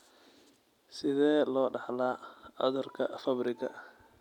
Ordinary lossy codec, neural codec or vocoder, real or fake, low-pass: none; none; real; 19.8 kHz